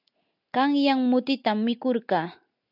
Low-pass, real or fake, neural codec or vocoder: 5.4 kHz; real; none